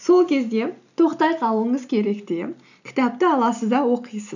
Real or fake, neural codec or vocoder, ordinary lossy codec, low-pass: real; none; none; 7.2 kHz